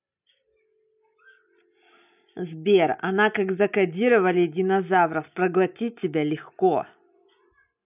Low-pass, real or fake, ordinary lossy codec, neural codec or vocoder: 3.6 kHz; real; none; none